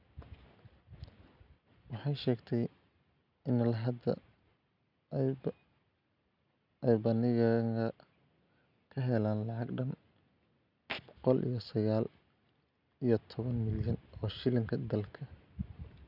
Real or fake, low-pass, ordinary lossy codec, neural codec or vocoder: real; 5.4 kHz; none; none